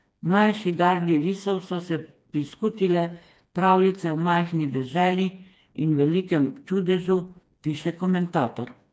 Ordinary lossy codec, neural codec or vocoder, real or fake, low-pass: none; codec, 16 kHz, 2 kbps, FreqCodec, smaller model; fake; none